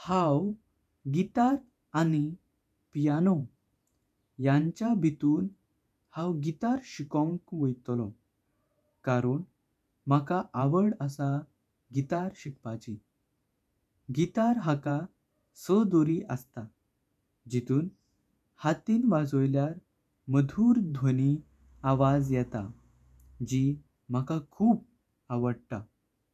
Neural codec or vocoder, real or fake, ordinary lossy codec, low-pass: vocoder, 48 kHz, 128 mel bands, Vocos; fake; none; 14.4 kHz